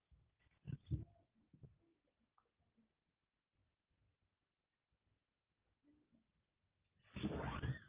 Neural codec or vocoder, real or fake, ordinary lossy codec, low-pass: none; real; Opus, 24 kbps; 3.6 kHz